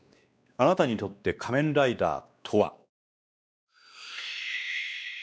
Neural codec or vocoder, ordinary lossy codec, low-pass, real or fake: codec, 16 kHz, 2 kbps, X-Codec, WavLM features, trained on Multilingual LibriSpeech; none; none; fake